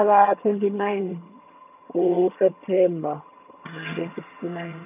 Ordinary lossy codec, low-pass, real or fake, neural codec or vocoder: none; 3.6 kHz; fake; vocoder, 22.05 kHz, 80 mel bands, HiFi-GAN